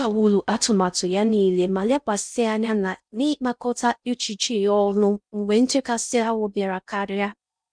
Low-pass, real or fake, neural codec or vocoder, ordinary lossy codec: 9.9 kHz; fake; codec, 16 kHz in and 24 kHz out, 0.6 kbps, FocalCodec, streaming, 4096 codes; none